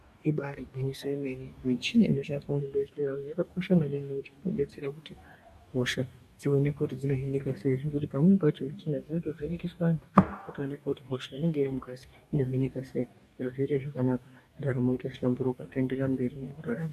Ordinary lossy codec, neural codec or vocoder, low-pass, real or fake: MP3, 96 kbps; codec, 44.1 kHz, 2.6 kbps, DAC; 14.4 kHz; fake